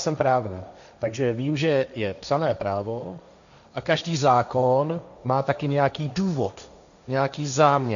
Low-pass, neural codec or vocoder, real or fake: 7.2 kHz; codec, 16 kHz, 1.1 kbps, Voila-Tokenizer; fake